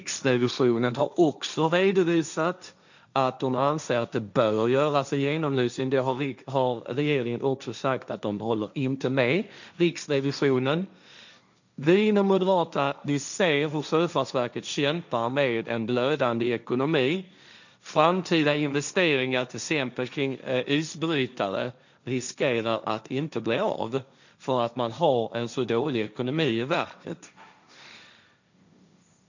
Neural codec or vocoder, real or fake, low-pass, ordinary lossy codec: codec, 16 kHz, 1.1 kbps, Voila-Tokenizer; fake; 7.2 kHz; none